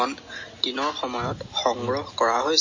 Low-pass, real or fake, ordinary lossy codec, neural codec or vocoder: 7.2 kHz; real; MP3, 32 kbps; none